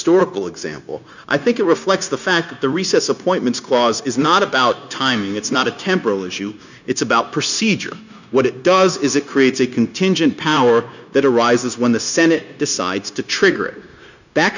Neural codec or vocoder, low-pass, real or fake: codec, 16 kHz, 0.9 kbps, LongCat-Audio-Codec; 7.2 kHz; fake